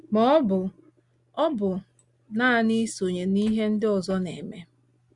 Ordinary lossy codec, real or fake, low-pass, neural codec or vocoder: Opus, 64 kbps; real; 10.8 kHz; none